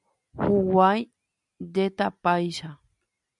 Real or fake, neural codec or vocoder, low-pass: real; none; 10.8 kHz